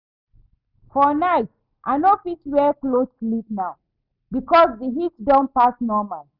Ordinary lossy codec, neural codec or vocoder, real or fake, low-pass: none; none; real; 5.4 kHz